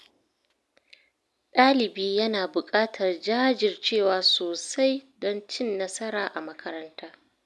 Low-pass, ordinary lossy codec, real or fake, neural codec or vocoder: none; none; real; none